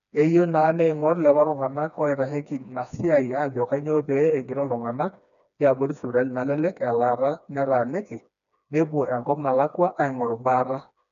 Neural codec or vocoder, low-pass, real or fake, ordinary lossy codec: codec, 16 kHz, 2 kbps, FreqCodec, smaller model; 7.2 kHz; fake; none